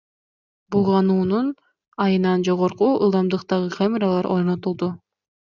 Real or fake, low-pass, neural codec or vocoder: real; 7.2 kHz; none